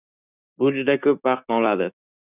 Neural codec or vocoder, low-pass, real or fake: codec, 16 kHz, 6 kbps, DAC; 3.6 kHz; fake